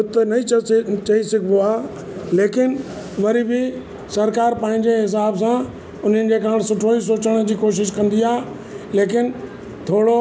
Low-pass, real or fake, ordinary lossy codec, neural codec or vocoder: none; real; none; none